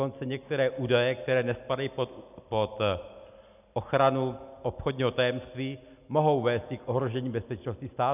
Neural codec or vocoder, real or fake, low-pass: none; real; 3.6 kHz